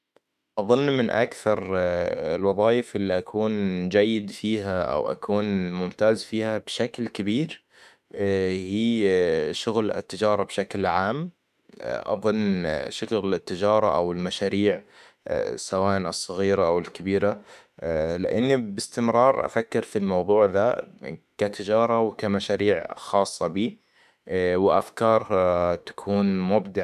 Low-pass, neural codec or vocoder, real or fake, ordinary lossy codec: 14.4 kHz; autoencoder, 48 kHz, 32 numbers a frame, DAC-VAE, trained on Japanese speech; fake; none